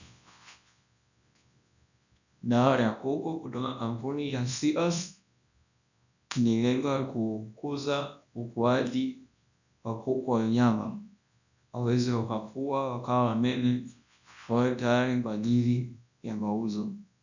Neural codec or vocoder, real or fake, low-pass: codec, 24 kHz, 0.9 kbps, WavTokenizer, large speech release; fake; 7.2 kHz